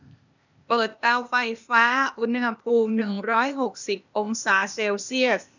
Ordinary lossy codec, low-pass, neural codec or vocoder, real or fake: none; 7.2 kHz; codec, 16 kHz, 0.8 kbps, ZipCodec; fake